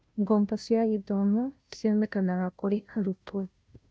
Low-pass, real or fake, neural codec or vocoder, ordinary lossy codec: none; fake; codec, 16 kHz, 0.5 kbps, FunCodec, trained on Chinese and English, 25 frames a second; none